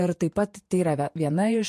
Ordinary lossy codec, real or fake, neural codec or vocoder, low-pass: MP3, 64 kbps; fake; vocoder, 48 kHz, 128 mel bands, Vocos; 14.4 kHz